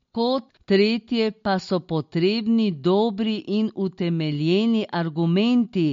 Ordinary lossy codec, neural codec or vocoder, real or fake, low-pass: MP3, 48 kbps; none; real; 7.2 kHz